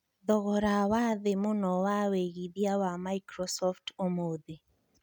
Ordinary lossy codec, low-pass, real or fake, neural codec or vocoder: none; 19.8 kHz; real; none